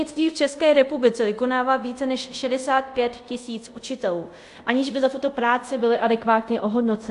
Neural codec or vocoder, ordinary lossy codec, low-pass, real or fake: codec, 24 kHz, 0.5 kbps, DualCodec; AAC, 64 kbps; 10.8 kHz; fake